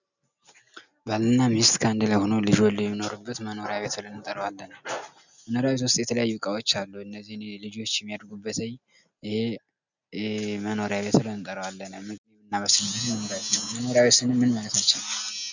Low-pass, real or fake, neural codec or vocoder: 7.2 kHz; real; none